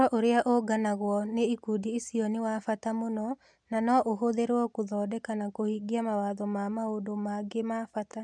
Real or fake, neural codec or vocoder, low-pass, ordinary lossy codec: real; none; 9.9 kHz; MP3, 96 kbps